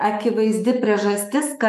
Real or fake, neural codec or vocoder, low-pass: fake; autoencoder, 48 kHz, 128 numbers a frame, DAC-VAE, trained on Japanese speech; 14.4 kHz